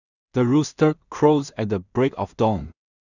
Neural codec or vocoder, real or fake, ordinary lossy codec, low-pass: codec, 16 kHz in and 24 kHz out, 0.4 kbps, LongCat-Audio-Codec, two codebook decoder; fake; none; 7.2 kHz